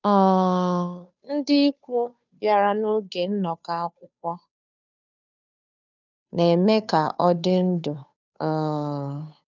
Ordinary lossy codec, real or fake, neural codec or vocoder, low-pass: none; fake; codec, 16 kHz, 2 kbps, FunCodec, trained on Chinese and English, 25 frames a second; 7.2 kHz